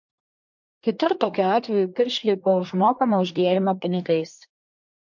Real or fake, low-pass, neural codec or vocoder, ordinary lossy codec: fake; 7.2 kHz; codec, 24 kHz, 1 kbps, SNAC; MP3, 48 kbps